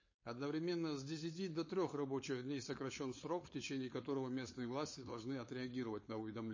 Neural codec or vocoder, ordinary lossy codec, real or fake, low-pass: codec, 16 kHz, 4.8 kbps, FACodec; MP3, 32 kbps; fake; 7.2 kHz